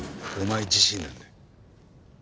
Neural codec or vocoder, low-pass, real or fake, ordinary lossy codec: none; none; real; none